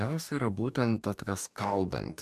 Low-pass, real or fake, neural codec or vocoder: 14.4 kHz; fake; codec, 44.1 kHz, 2.6 kbps, DAC